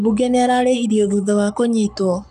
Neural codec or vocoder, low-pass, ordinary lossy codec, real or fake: codec, 44.1 kHz, 7.8 kbps, Pupu-Codec; 14.4 kHz; none; fake